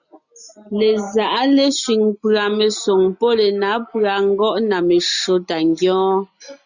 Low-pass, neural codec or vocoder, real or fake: 7.2 kHz; none; real